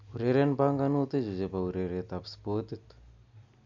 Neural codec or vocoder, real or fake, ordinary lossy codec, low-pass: none; real; none; 7.2 kHz